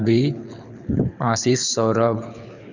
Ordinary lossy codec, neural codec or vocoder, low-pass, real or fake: none; codec, 24 kHz, 6 kbps, HILCodec; 7.2 kHz; fake